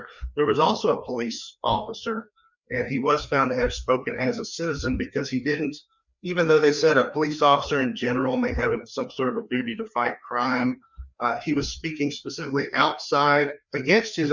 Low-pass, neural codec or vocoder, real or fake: 7.2 kHz; codec, 16 kHz, 2 kbps, FreqCodec, larger model; fake